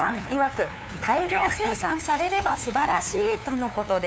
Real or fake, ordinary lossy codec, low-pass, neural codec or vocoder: fake; none; none; codec, 16 kHz, 2 kbps, FunCodec, trained on LibriTTS, 25 frames a second